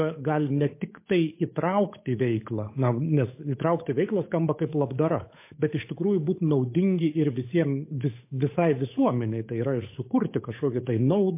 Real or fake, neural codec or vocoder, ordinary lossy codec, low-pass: fake; codec, 16 kHz, 8 kbps, FunCodec, trained on Chinese and English, 25 frames a second; MP3, 24 kbps; 3.6 kHz